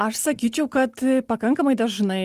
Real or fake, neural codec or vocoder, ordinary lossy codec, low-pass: real; none; Opus, 24 kbps; 14.4 kHz